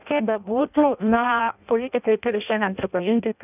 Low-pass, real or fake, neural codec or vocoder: 3.6 kHz; fake; codec, 16 kHz in and 24 kHz out, 0.6 kbps, FireRedTTS-2 codec